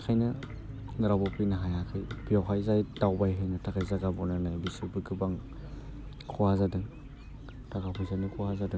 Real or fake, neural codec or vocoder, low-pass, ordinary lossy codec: real; none; none; none